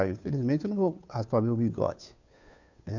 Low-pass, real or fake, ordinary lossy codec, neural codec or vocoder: 7.2 kHz; fake; none; codec, 16 kHz, 2 kbps, FunCodec, trained on Chinese and English, 25 frames a second